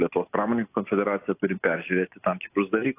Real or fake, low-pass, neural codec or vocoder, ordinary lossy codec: real; 3.6 kHz; none; AAC, 24 kbps